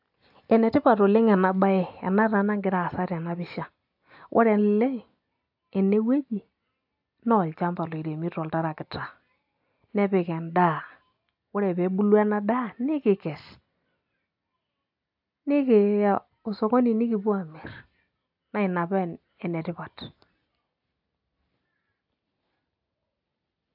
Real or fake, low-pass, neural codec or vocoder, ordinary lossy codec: real; 5.4 kHz; none; AAC, 48 kbps